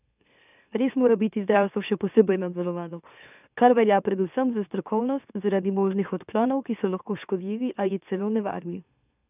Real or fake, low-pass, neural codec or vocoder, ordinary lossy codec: fake; 3.6 kHz; autoencoder, 44.1 kHz, a latent of 192 numbers a frame, MeloTTS; none